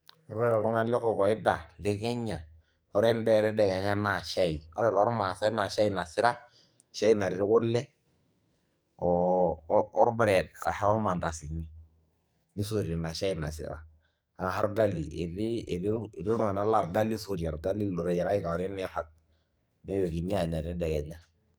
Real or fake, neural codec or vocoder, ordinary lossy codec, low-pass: fake; codec, 44.1 kHz, 2.6 kbps, SNAC; none; none